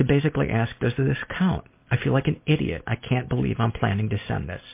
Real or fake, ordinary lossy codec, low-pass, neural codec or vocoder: real; MP3, 24 kbps; 3.6 kHz; none